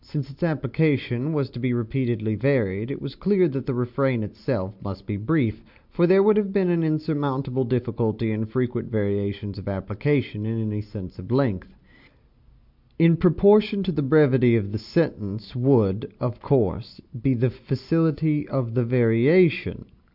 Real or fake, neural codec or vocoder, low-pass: real; none; 5.4 kHz